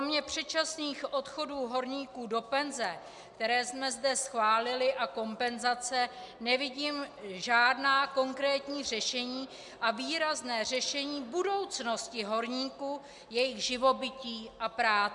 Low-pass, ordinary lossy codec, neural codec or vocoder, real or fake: 10.8 kHz; MP3, 96 kbps; none; real